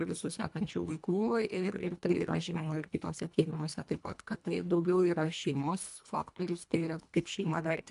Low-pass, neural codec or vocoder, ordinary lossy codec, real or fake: 10.8 kHz; codec, 24 kHz, 1.5 kbps, HILCodec; AAC, 96 kbps; fake